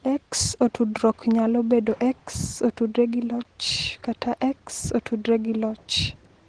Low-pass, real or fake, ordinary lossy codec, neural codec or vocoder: 9.9 kHz; real; Opus, 16 kbps; none